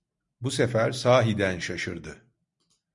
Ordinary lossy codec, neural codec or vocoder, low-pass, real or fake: MP3, 96 kbps; none; 10.8 kHz; real